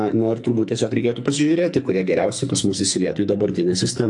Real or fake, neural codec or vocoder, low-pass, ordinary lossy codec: fake; codec, 44.1 kHz, 2.6 kbps, SNAC; 10.8 kHz; AAC, 64 kbps